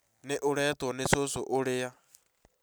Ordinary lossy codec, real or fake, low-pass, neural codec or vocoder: none; real; none; none